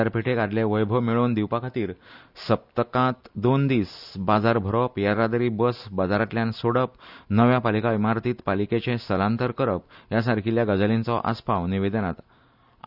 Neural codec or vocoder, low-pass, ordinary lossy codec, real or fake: none; 5.4 kHz; none; real